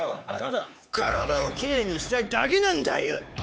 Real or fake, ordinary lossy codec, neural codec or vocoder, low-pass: fake; none; codec, 16 kHz, 4 kbps, X-Codec, HuBERT features, trained on LibriSpeech; none